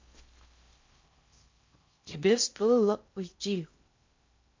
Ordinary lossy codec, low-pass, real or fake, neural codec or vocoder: MP3, 48 kbps; 7.2 kHz; fake; codec, 16 kHz in and 24 kHz out, 0.6 kbps, FocalCodec, streaming, 4096 codes